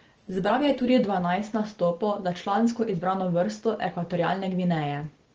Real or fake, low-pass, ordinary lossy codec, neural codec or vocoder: real; 7.2 kHz; Opus, 16 kbps; none